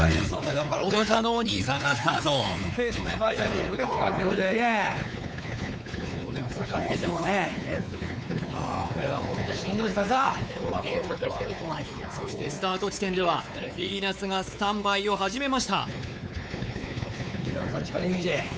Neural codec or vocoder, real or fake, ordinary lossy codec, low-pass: codec, 16 kHz, 4 kbps, X-Codec, WavLM features, trained on Multilingual LibriSpeech; fake; none; none